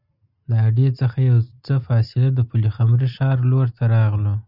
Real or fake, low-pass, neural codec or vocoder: real; 5.4 kHz; none